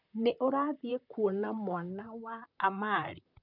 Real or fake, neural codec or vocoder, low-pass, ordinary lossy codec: fake; vocoder, 44.1 kHz, 128 mel bands, Pupu-Vocoder; 5.4 kHz; none